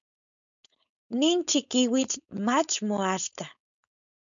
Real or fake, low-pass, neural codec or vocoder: fake; 7.2 kHz; codec, 16 kHz, 4.8 kbps, FACodec